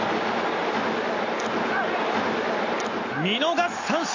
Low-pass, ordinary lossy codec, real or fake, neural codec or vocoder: 7.2 kHz; AAC, 48 kbps; real; none